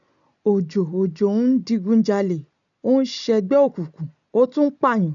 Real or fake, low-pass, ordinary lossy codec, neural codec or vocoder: real; 7.2 kHz; none; none